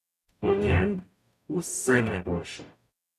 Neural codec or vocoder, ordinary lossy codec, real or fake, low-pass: codec, 44.1 kHz, 0.9 kbps, DAC; none; fake; 14.4 kHz